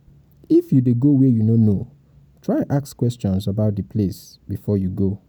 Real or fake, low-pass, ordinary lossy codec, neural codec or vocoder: real; none; none; none